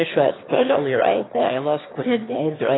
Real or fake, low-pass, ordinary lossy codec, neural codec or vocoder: fake; 7.2 kHz; AAC, 16 kbps; autoencoder, 22.05 kHz, a latent of 192 numbers a frame, VITS, trained on one speaker